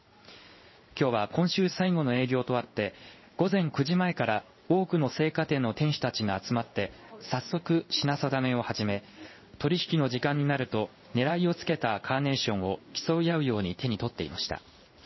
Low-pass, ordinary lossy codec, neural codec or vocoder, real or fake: 7.2 kHz; MP3, 24 kbps; codec, 16 kHz in and 24 kHz out, 1 kbps, XY-Tokenizer; fake